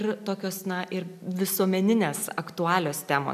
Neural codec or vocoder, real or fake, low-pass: none; real; 14.4 kHz